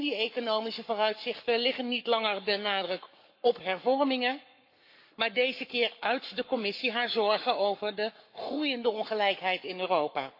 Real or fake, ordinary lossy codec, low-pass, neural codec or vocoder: fake; MP3, 32 kbps; 5.4 kHz; codec, 44.1 kHz, 7.8 kbps, Pupu-Codec